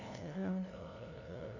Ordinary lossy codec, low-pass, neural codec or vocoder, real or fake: none; 7.2 kHz; codec, 16 kHz, 0.5 kbps, FunCodec, trained on LibriTTS, 25 frames a second; fake